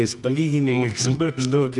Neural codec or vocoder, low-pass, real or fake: codec, 24 kHz, 0.9 kbps, WavTokenizer, medium music audio release; 10.8 kHz; fake